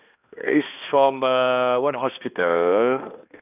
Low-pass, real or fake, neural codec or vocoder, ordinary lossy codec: 3.6 kHz; fake; codec, 16 kHz, 1 kbps, X-Codec, HuBERT features, trained on balanced general audio; none